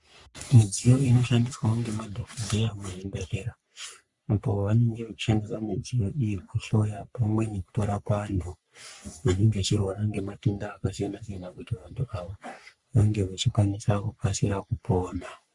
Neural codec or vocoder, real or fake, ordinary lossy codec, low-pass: codec, 44.1 kHz, 3.4 kbps, Pupu-Codec; fake; Opus, 64 kbps; 10.8 kHz